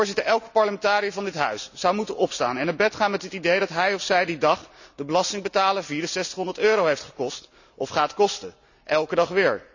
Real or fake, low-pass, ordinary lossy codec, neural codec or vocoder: real; 7.2 kHz; none; none